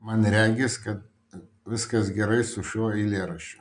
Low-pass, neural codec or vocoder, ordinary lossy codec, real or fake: 9.9 kHz; none; MP3, 96 kbps; real